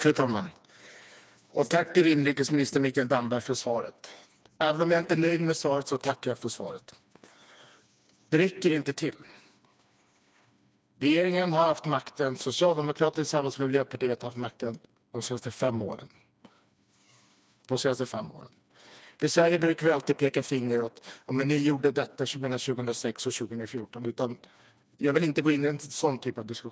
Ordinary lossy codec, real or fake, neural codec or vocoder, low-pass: none; fake; codec, 16 kHz, 2 kbps, FreqCodec, smaller model; none